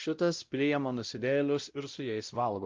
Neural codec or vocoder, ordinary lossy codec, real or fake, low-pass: codec, 16 kHz, 0.5 kbps, X-Codec, WavLM features, trained on Multilingual LibriSpeech; Opus, 24 kbps; fake; 7.2 kHz